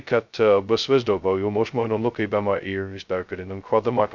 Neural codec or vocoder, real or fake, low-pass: codec, 16 kHz, 0.2 kbps, FocalCodec; fake; 7.2 kHz